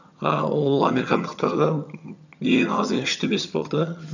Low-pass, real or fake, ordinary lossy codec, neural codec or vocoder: 7.2 kHz; fake; none; vocoder, 22.05 kHz, 80 mel bands, HiFi-GAN